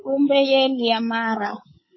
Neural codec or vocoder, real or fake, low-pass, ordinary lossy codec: codec, 24 kHz, 3.1 kbps, DualCodec; fake; 7.2 kHz; MP3, 24 kbps